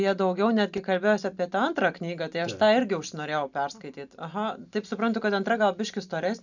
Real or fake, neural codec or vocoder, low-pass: real; none; 7.2 kHz